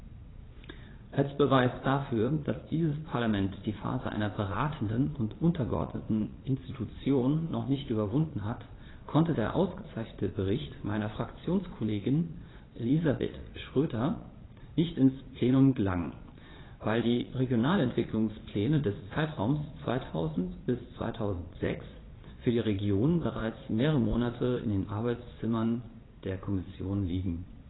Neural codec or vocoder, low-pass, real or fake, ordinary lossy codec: vocoder, 22.05 kHz, 80 mel bands, Vocos; 7.2 kHz; fake; AAC, 16 kbps